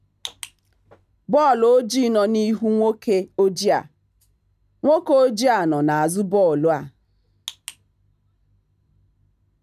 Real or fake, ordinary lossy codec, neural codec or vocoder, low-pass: real; none; none; 14.4 kHz